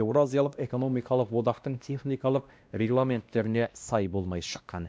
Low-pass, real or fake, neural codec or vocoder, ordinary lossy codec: none; fake; codec, 16 kHz, 1 kbps, X-Codec, WavLM features, trained on Multilingual LibriSpeech; none